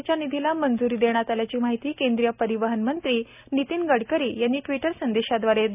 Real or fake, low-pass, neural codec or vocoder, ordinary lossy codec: real; 3.6 kHz; none; none